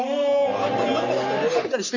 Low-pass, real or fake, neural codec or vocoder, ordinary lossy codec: 7.2 kHz; fake; codec, 44.1 kHz, 3.4 kbps, Pupu-Codec; none